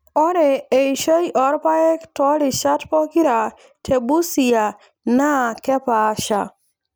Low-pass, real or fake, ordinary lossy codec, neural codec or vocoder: none; real; none; none